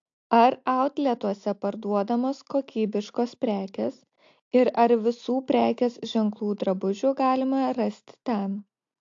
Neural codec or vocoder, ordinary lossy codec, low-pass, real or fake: none; AAC, 48 kbps; 7.2 kHz; real